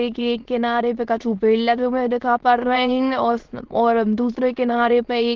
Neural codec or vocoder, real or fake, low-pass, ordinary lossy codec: autoencoder, 22.05 kHz, a latent of 192 numbers a frame, VITS, trained on many speakers; fake; 7.2 kHz; Opus, 16 kbps